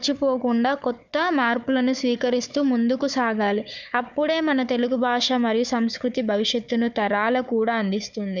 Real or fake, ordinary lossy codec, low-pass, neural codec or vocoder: fake; none; 7.2 kHz; codec, 16 kHz, 4 kbps, FunCodec, trained on Chinese and English, 50 frames a second